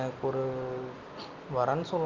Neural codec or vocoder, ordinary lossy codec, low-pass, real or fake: none; Opus, 24 kbps; 7.2 kHz; real